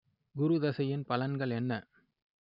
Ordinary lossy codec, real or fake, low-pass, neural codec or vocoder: none; real; 5.4 kHz; none